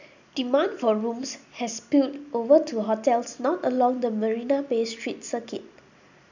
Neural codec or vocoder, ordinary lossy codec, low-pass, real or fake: none; none; 7.2 kHz; real